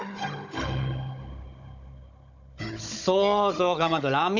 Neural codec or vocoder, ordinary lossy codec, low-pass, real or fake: codec, 16 kHz, 16 kbps, FunCodec, trained on Chinese and English, 50 frames a second; none; 7.2 kHz; fake